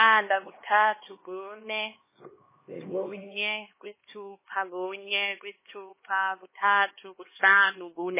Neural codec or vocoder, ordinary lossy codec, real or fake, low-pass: codec, 16 kHz, 2 kbps, X-Codec, HuBERT features, trained on LibriSpeech; MP3, 16 kbps; fake; 3.6 kHz